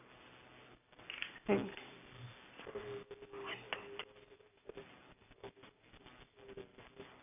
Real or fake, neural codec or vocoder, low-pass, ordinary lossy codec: real; none; 3.6 kHz; none